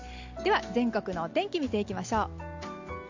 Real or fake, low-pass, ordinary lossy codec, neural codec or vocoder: real; 7.2 kHz; MP3, 48 kbps; none